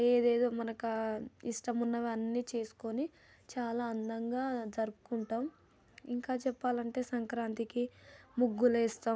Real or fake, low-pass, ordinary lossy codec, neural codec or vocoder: real; none; none; none